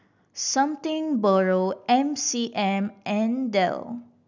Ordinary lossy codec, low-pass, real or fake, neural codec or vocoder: none; 7.2 kHz; real; none